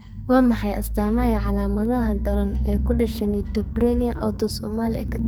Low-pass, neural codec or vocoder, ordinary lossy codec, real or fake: none; codec, 44.1 kHz, 2.6 kbps, SNAC; none; fake